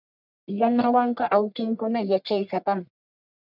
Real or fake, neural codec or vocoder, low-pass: fake; codec, 44.1 kHz, 1.7 kbps, Pupu-Codec; 5.4 kHz